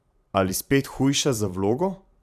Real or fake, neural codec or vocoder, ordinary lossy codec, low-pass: fake; vocoder, 44.1 kHz, 128 mel bands every 512 samples, BigVGAN v2; none; 14.4 kHz